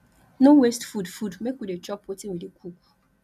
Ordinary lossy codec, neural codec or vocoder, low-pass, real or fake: none; none; 14.4 kHz; real